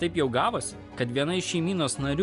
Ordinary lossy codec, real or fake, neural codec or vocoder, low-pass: Opus, 64 kbps; real; none; 10.8 kHz